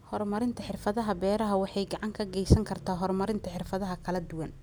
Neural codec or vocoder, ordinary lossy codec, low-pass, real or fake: none; none; none; real